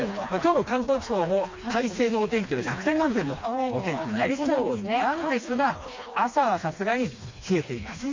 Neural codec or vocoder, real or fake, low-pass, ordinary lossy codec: codec, 16 kHz, 2 kbps, FreqCodec, smaller model; fake; 7.2 kHz; MP3, 48 kbps